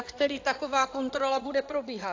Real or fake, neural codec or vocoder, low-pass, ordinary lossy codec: fake; codec, 16 kHz in and 24 kHz out, 2.2 kbps, FireRedTTS-2 codec; 7.2 kHz; AAC, 48 kbps